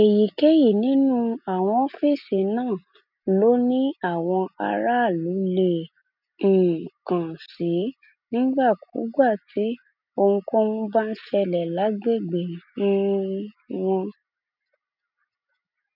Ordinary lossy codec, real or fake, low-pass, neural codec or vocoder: none; real; 5.4 kHz; none